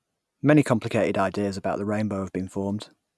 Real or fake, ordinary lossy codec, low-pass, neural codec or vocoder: real; none; none; none